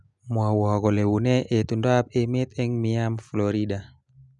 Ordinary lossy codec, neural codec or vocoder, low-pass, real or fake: none; none; 10.8 kHz; real